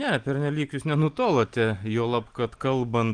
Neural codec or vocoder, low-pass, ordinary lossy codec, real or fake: none; 9.9 kHz; Opus, 32 kbps; real